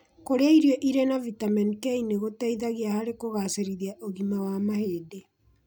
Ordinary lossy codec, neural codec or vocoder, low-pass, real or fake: none; none; none; real